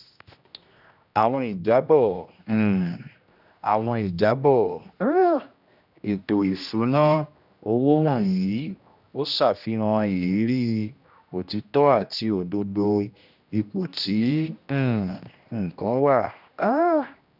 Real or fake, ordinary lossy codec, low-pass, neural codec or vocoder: fake; none; 5.4 kHz; codec, 16 kHz, 1 kbps, X-Codec, HuBERT features, trained on general audio